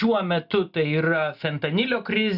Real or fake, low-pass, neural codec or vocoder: real; 5.4 kHz; none